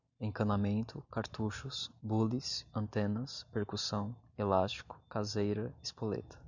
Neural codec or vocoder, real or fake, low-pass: none; real; 7.2 kHz